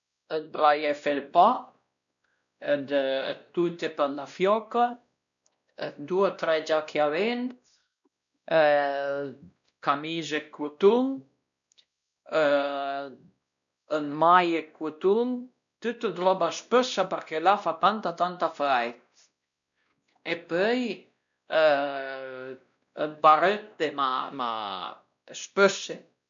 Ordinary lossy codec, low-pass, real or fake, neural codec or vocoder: none; 7.2 kHz; fake; codec, 16 kHz, 1 kbps, X-Codec, WavLM features, trained on Multilingual LibriSpeech